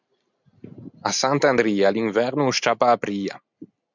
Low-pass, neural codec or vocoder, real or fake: 7.2 kHz; none; real